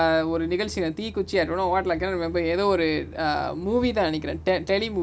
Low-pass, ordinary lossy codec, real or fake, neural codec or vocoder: none; none; real; none